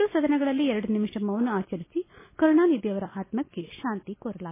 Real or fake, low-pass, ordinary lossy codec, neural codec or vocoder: real; 3.6 kHz; MP3, 16 kbps; none